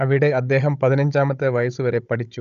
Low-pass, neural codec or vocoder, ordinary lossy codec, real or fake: 7.2 kHz; codec, 16 kHz, 16 kbps, FunCodec, trained on Chinese and English, 50 frames a second; none; fake